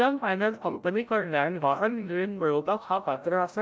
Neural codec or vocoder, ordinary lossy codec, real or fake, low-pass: codec, 16 kHz, 0.5 kbps, FreqCodec, larger model; none; fake; none